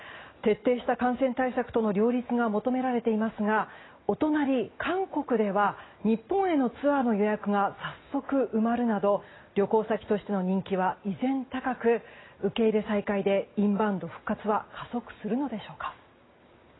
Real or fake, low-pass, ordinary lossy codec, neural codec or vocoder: real; 7.2 kHz; AAC, 16 kbps; none